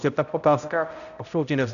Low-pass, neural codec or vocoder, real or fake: 7.2 kHz; codec, 16 kHz, 0.5 kbps, X-Codec, HuBERT features, trained on balanced general audio; fake